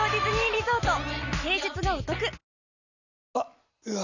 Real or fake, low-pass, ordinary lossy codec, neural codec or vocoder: real; 7.2 kHz; none; none